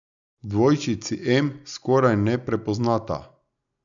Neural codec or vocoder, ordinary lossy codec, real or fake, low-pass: none; none; real; 7.2 kHz